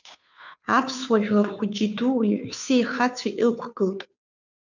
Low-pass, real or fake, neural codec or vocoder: 7.2 kHz; fake; codec, 16 kHz, 2 kbps, FunCodec, trained on Chinese and English, 25 frames a second